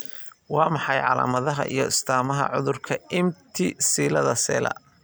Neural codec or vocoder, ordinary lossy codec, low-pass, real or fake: none; none; none; real